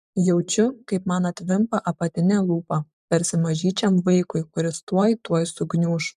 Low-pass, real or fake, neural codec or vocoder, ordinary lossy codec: 14.4 kHz; fake; vocoder, 44.1 kHz, 128 mel bands every 512 samples, BigVGAN v2; MP3, 96 kbps